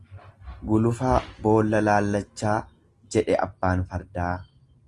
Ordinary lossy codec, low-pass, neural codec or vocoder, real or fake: Opus, 24 kbps; 10.8 kHz; none; real